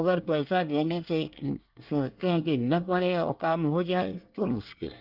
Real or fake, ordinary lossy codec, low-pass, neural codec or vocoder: fake; Opus, 24 kbps; 5.4 kHz; codec, 24 kHz, 1 kbps, SNAC